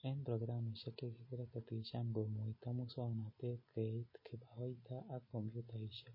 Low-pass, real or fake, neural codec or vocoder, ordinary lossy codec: 5.4 kHz; real; none; MP3, 24 kbps